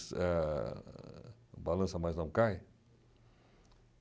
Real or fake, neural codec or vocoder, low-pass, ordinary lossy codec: real; none; none; none